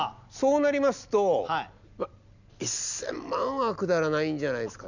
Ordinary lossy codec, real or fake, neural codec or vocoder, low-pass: none; real; none; 7.2 kHz